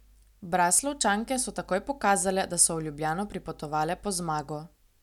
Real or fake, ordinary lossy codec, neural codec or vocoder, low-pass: real; none; none; 19.8 kHz